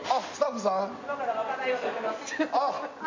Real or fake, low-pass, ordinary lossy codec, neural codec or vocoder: real; 7.2 kHz; MP3, 64 kbps; none